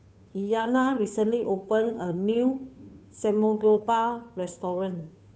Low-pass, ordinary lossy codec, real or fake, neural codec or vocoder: none; none; fake; codec, 16 kHz, 2 kbps, FunCodec, trained on Chinese and English, 25 frames a second